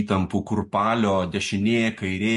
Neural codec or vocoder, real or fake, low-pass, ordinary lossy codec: none; real; 14.4 kHz; MP3, 48 kbps